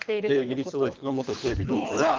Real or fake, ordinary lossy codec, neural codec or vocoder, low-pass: fake; Opus, 32 kbps; codec, 24 kHz, 3 kbps, HILCodec; 7.2 kHz